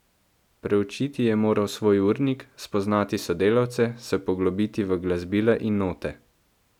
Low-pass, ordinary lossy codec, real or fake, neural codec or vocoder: 19.8 kHz; none; real; none